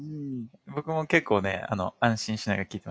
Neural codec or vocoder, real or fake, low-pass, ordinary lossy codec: none; real; none; none